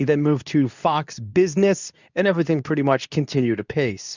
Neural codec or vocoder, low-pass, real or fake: codec, 24 kHz, 0.9 kbps, WavTokenizer, medium speech release version 2; 7.2 kHz; fake